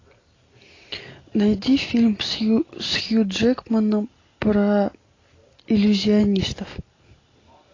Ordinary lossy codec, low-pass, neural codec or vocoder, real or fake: AAC, 32 kbps; 7.2 kHz; none; real